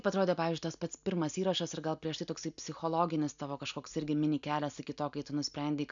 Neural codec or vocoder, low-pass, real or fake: none; 7.2 kHz; real